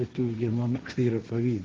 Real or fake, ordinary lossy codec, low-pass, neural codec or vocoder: fake; Opus, 16 kbps; 7.2 kHz; codec, 16 kHz, 1.1 kbps, Voila-Tokenizer